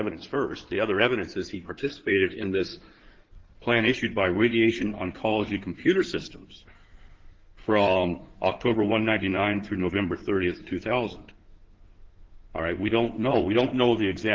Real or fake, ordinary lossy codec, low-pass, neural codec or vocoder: fake; Opus, 16 kbps; 7.2 kHz; codec, 16 kHz in and 24 kHz out, 2.2 kbps, FireRedTTS-2 codec